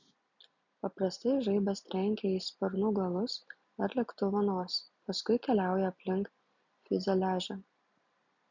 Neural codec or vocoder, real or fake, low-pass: none; real; 7.2 kHz